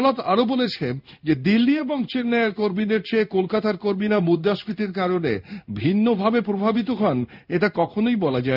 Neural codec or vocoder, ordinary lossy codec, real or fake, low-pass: codec, 16 kHz in and 24 kHz out, 1 kbps, XY-Tokenizer; none; fake; 5.4 kHz